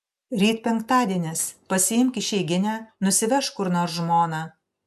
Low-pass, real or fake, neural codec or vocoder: 14.4 kHz; real; none